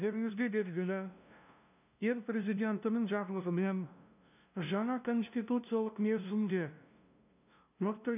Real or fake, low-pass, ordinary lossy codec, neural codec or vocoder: fake; 3.6 kHz; none; codec, 16 kHz, 0.5 kbps, FunCodec, trained on LibriTTS, 25 frames a second